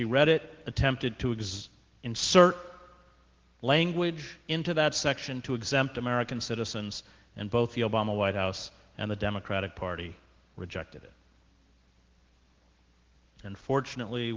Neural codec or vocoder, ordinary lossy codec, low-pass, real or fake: none; Opus, 16 kbps; 7.2 kHz; real